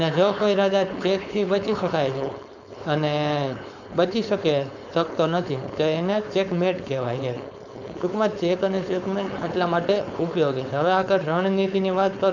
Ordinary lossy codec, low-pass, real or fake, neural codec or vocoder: none; 7.2 kHz; fake; codec, 16 kHz, 4.8 kbps, FACodec